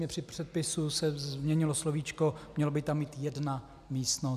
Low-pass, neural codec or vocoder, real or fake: 14.4 kHz; none; real